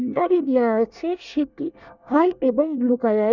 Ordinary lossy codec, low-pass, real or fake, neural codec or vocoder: none; 7.2 kHz; fake; codec, 24 kHz, 1 kbps, SNAC